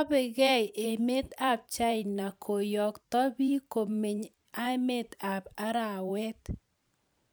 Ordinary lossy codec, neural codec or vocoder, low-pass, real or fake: none; vocoder, 44.1 kHz, 128 mel bands every 512 samples, BigVGAN v2; none; fake